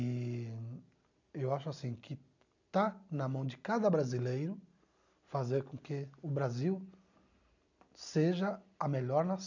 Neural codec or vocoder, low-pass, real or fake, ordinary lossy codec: none; 7.2 kHz; real; none